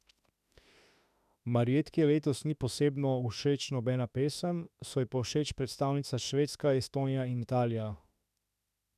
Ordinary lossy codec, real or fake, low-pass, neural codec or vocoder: none; fake; 14.4 kHz; autoencoder, 48 kHz, 32 numbers a frame, DAC-VAE, trained on Japanese speech